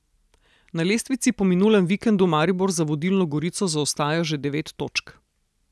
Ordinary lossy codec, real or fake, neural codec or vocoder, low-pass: none; real; none; none